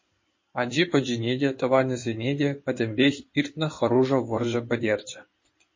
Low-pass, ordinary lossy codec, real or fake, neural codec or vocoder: 7.2 kHz; MP3, 32 kbps; fake; codec, 16 kHz in and 24 kHz out, 2.2 kbps, FireRedTTS-2 codec